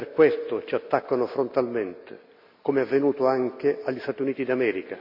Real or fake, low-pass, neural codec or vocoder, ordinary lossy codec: real; 5.4 kHz; none; MP3, 48 kbps